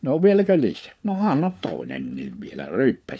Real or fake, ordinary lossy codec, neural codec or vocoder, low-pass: fake; none; codec, 16 kHz, 4 kbps, FunCodec, trained on LibriTTS, 50 frames a second; none